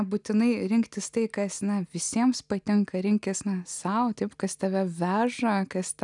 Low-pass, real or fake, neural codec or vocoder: 10.8 kHz; real; none